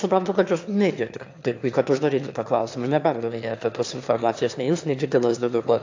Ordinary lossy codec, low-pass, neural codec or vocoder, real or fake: AAC, 48 kbps; 7.2 kHz; autoencoder, 22.05 kHz, a latent of 192 numbers a frame, VITS, trained on one speaker; fake